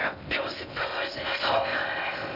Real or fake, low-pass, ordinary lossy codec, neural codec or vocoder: fake; 5.4 kHz; none; codec, 16 kHz in and 24 kHz out, 0.6 kbps, FocalCodec, streaming, 2048 codes